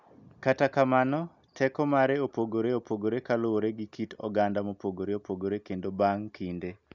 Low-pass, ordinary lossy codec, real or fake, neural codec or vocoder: 7.2 kHz; none; real; none